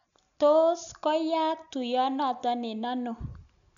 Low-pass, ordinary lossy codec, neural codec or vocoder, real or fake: 7.2 kHz; none; none; real